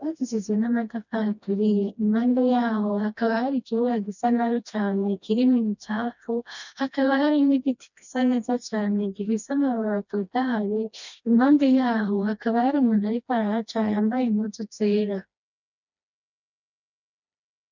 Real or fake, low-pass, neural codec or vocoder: fake; 7.2 kHz; codec, 16 kHz, 1 kbps, FreqCodec, smaller model